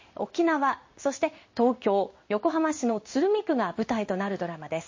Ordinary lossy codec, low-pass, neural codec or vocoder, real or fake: MP3, 32 kbps; 7.2 kHz; codec, 16 kHz in and 24 kHz out, 1 kbps, XY-Tokenizer; fake